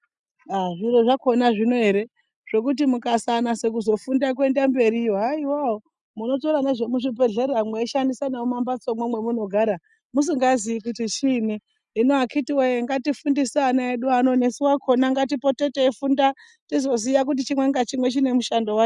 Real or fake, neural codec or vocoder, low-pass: real; none; 10.8 kHz